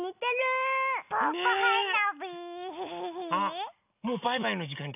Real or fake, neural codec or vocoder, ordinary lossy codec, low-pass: real; none; none; 3.6 kHz